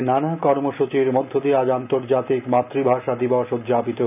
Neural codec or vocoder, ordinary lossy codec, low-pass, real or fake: none; none; 3.6 kHz; real